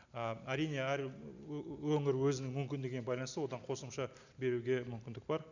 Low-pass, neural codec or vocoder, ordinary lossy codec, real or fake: 7.2 kHz; none; none; real